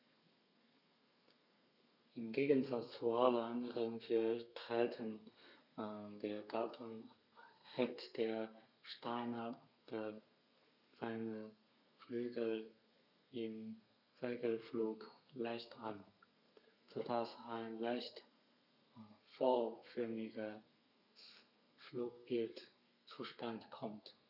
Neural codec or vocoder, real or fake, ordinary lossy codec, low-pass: codec, 32 kHz, 1.9 kbps, SNAC; fake; none; 5.4 kHz